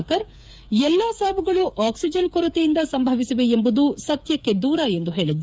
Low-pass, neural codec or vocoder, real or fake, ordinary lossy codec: none; codec, 16 kHz, 8 kbps, FreqCodec, smaller model; fake; none